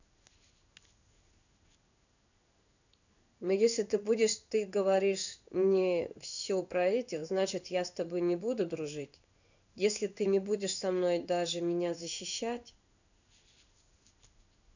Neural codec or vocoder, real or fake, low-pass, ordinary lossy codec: codec, 16 kHz in and 24 kHz out, 1 kbps, XY-Tokenizer; fake; 7.2 kHz; none